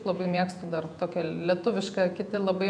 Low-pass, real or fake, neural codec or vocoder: 9.9 kHz; fake; vocoder, 48 kHz, 128 mel bands, Vocos